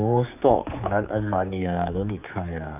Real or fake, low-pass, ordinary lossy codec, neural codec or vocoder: fake; 3.6 kHz; none; codec, 16 kHz, 4 kbps, X-Codec, HuBERT features, trained on general audio